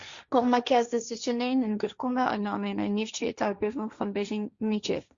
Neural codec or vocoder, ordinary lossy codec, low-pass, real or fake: codec, 16 kHz, 1.1 kbps, Voila-Tokenizer; Opus, 64 kbps; 7.2 kHz; fake